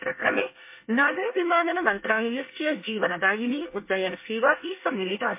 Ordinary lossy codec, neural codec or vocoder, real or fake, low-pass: MP3, 24 kbps; codec, 24 kHz, 1 kbps, SNAC; fake; 3.6 kHz